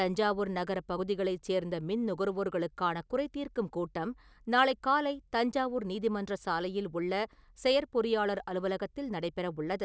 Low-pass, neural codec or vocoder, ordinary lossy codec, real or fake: none; none; none; real